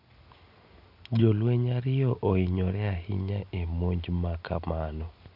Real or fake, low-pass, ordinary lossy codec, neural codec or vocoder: real; 5.4 kHz; none; none